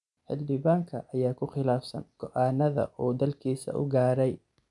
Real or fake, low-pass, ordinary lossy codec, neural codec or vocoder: real; 10.8 kHz; none; none